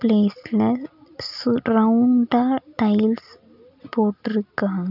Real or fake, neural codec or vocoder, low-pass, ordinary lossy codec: real; none; 5.4 kHz; none